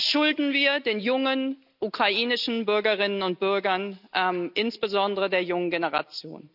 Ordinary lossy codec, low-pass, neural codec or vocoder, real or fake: none; 5.4 kHz; none; real